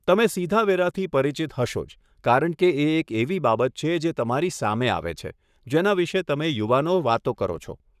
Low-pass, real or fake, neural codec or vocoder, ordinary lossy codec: 14.4 kHz; fake; codec, 44.1 kHz, 7.8 kbps, Pupu-Codec; none